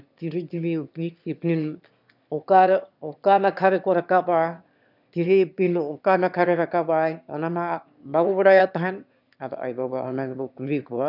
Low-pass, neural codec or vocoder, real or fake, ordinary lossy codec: 5.4 kHz; autoencoder, 22.05 kHz, a latent of 192 numbers a frame, VITS, trained on one speaker; fake; none